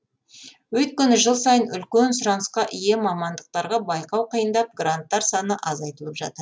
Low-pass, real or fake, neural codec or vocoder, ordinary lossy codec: none; real; none; none